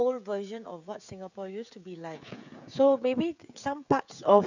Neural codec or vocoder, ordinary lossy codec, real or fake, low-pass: codec, 16 kHz, 16 kbps, FreqCodec, smaller model; none; fake; 7.2 kHz